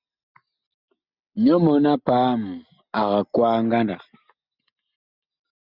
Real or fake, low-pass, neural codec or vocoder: real; 5.4 kHz; none